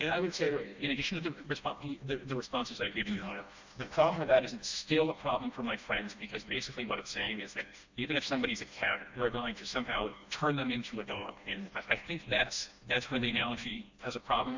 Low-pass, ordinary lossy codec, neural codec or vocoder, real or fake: 7.2 kHz; MP3, 64 kbps; codec, 16 kHz, 1 kbps, FreqCodec, smaller model; fake